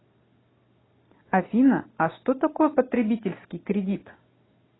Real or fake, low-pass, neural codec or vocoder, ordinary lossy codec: real; 7.2 kHz; none; AAC, 16 kbps